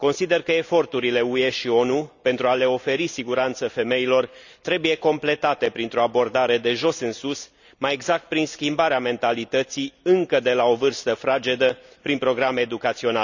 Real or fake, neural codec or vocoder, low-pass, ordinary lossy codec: real; none; 7.2 kHz; none